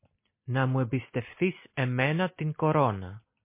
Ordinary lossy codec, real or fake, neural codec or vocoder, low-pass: MP3, 24 kbps; real; none; 3.6 kHz